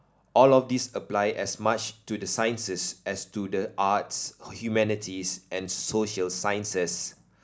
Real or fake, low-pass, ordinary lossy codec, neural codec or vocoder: real; none; none; none